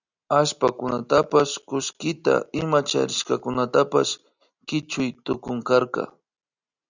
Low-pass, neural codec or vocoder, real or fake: 7.2 kHz; none; real